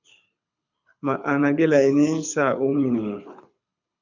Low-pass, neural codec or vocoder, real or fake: 7.2 kHz; codec, 24 kHz, 6 kbps, HILCodec; fake